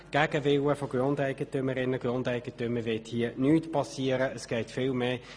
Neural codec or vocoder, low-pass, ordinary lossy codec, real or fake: none; none; none; real